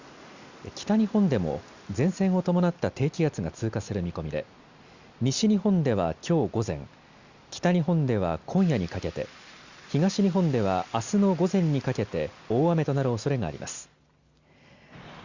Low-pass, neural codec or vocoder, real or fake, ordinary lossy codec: 7.2 kHz; none; real; Opus, 64 kbps